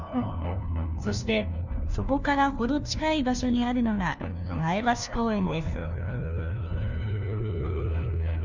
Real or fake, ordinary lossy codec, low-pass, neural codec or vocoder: fake; none; 7.2 kHz; codec, 16 kHz, 1 kbps, FunCodec, trained on LibriTTS, 50 frames a second